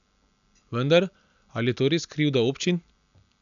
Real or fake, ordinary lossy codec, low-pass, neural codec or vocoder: real; none; 7.2 kHz; none